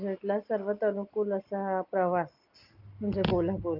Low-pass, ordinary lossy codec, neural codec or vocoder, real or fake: 5.4 kHz; Opus, 24 kbps; none; real